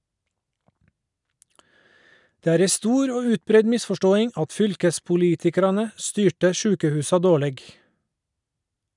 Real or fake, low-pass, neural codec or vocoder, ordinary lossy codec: real; 10.8 kHz; none; none